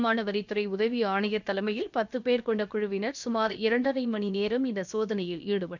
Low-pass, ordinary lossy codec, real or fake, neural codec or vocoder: 7.2 kHz; none; fake; codec, 16 kHz, about 1 kbps, DyCAST, with the encoder's durations